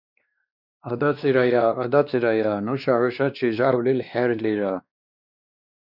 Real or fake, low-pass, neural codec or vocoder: fake; 5.4 kHz; codec, 16 kHz, 2 kbps, X-Codec, WavLM features, trained on Multilingual LibriSpeech